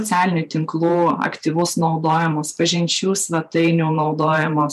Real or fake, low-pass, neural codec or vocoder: fake; 14.4 kHz; vocoder, 44.1 kHz, 128 mel bands every 512 samples, BigVGAN v2